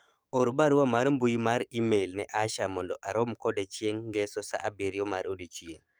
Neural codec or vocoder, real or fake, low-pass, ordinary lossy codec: codec, 44.1 kHz, 7.8 kbps, DAC; fake; none; none